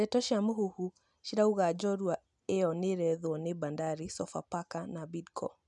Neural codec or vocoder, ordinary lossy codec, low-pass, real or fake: none; none; 10.8 kHz; real